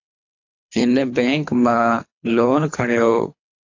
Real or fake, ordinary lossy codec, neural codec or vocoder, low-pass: fake; AAC, 48 kbps; codec, 24 kHz, 3 kbps, HILCodec; 7.2 kHz